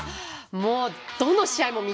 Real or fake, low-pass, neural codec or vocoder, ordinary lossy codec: real; none; none; none